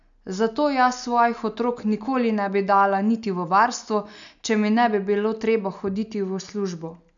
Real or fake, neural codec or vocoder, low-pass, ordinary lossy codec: real; none; 7.2 kHz; none